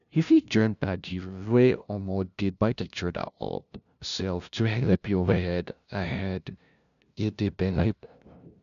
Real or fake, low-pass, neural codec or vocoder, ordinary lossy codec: fake; 7.2 kHz; codec, 16 kHz, 0.5 kbps, FunCodec, trained on LibriTTS, 25 frames a second; none